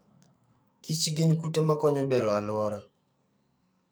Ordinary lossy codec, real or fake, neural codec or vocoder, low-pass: none; fake; codec, 44.1 kHz, 2.6 kbps, SNAC; none